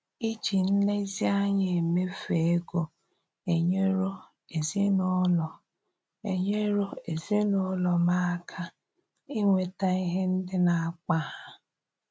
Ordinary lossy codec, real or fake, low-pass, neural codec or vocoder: none; real; none; none